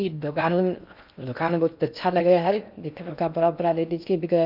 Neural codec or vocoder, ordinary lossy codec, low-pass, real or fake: codec, 16 kHz in and 24 kHz out, 0.6 kbps, FocalCodec, streaming, 4096 codes; none; 5.4 kHz; fake